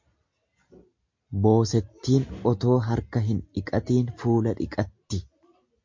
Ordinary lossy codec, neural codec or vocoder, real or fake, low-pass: MP3, 48 kbps; none; real; 7.2 kHz